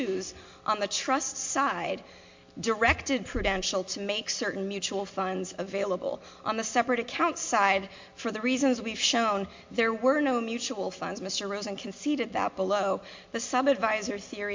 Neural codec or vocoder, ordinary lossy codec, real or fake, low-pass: none; MP3, 48 kbps; real; 7.2 kHz